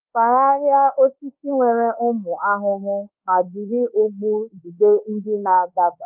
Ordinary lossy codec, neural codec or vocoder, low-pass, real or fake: Opus, 32 kbps; codec, 24 kHz, 1.2 kbps, DualCodec; 3.6 kHz; fake